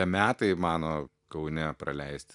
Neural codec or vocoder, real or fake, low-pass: none; real; 10.8 kHz